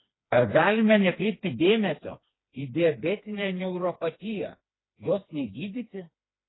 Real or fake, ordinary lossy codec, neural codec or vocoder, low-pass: fake; AAC, 16 kbps; codec, 16 kHz, 2 kbps, FreqCodec, smaller model; 7.2 kHz